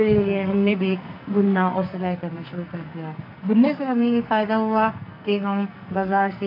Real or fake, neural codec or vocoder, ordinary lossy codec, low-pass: fake; codec, 44.1 kHz, 2.6 kbps, SNAC; none; 5.4 kHz